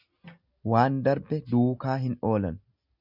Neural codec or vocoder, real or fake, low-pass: none; real; 5.4 kHz